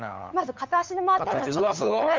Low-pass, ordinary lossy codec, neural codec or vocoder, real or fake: 7.2 kHz; MP3, 64 kbps; codec, 16 kHz, 8 kbps, FunCodec, trained on LibriTTS, 25 frames a second; fake